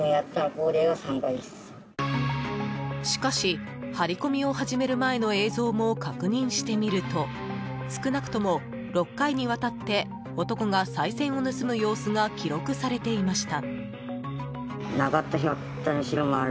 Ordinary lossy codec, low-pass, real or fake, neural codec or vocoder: none; none; real; none